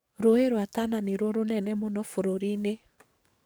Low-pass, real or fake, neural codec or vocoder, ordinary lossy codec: none; fake; codec, 44.1 kHz, 7.8 kbps, DAC; none